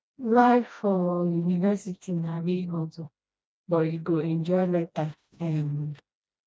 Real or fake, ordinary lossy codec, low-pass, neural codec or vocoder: fake; none; none; codec, 16 kHz, 1 kbps, FreqCodec, smaller model